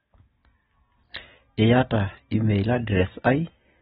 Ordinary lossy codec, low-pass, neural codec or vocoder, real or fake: AAC, 16 kbps; 19.8 kHz; none; real